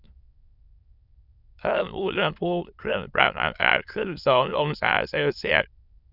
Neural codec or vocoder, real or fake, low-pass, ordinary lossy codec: autoencoder, 22.05 kHz, a latent of 192 numbers a frame, VITS, trained on many speakers; fake; 5.4 kHz; none